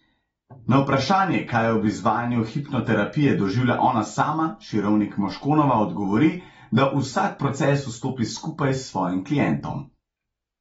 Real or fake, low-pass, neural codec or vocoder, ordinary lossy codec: real; 19.8 kHz; none; AAC, 24 kbps